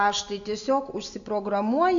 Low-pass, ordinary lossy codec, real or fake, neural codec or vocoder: 7.2 kHz; AAC, 64 kbps; real; none